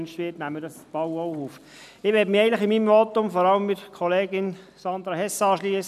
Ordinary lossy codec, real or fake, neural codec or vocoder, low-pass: none; real; none; 14.4 kHz